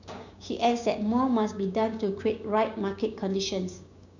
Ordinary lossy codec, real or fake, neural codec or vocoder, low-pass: none; fake; codec, 16 kHz, 6 kbps, DAC; 7.2 kHz